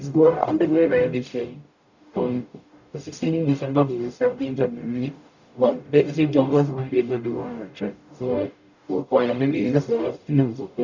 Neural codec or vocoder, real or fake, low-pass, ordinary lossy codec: codec, 44.1 kHz, 0.9 kbps, DAC; fake; 7.2 kHz; none